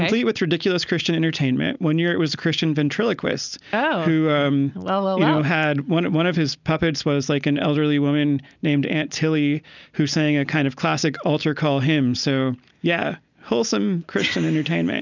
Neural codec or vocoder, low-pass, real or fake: none; 7.2 kHz; real